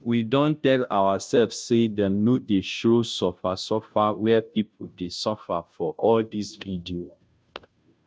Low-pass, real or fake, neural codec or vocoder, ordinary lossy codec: none; fake; codec, 16 kHz, 0.5 kbps, FunCodec, trained on Chinese and English, 25 frames a second; none